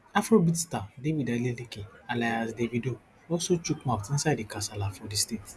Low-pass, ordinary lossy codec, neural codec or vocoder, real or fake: none; none; none; real